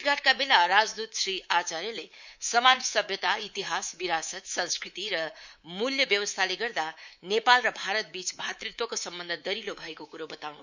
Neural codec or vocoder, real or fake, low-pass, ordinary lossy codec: codec, 24 kHz, 3.1 kbps, DualCodec; fake; 7.2 kHz; none